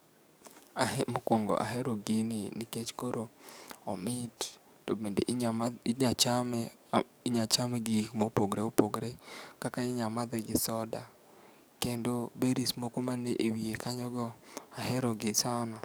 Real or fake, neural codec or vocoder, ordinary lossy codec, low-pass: fake; codec, 44.1 kHz, 7.8 kbps, DAC; none; none